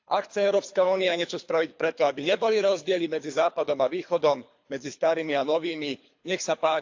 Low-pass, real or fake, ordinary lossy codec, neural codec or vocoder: 7.2 kHz; fake; AAC, 48 kbps; codec, 24 kHz, 3 kbps, HILCodec